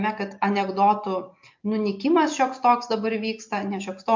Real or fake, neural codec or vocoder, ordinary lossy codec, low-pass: real; none; MP3, 48 kbps; 7.2 kHz